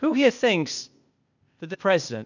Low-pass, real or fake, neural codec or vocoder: 7.2 kHz; fake; codec, 16 kHz, 0.8 kbps, ZipCodec